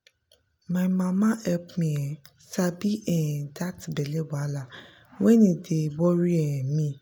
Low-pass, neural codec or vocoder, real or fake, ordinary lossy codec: none; none; real; none